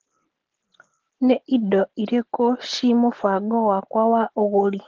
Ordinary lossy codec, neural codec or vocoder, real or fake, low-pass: Opus, 16 kbps; none; real; 7.2 kHz